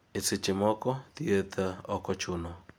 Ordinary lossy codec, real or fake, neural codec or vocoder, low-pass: none; real; none; none